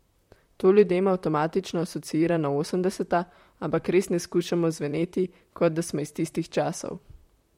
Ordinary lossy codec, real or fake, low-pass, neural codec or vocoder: MP3, 64 kbps; fake; 19.8 kHz; vocoder, 44.1 kHz, 128 mel bands, Pupu-Vocoder